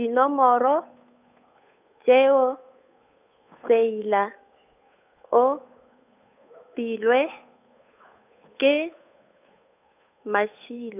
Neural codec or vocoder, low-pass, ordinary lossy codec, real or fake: codec, 16 kHz, 8 kbps, FunCodec, trained on Chinese and English, 25 frames a second; 3.6 kHz; none; fake